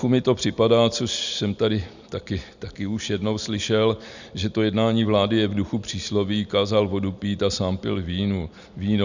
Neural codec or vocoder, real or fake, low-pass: none; real; 7.2 kHz